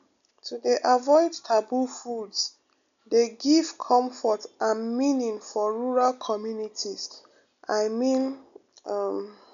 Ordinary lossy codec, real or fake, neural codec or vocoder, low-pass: none; real; none; 7.2 kHz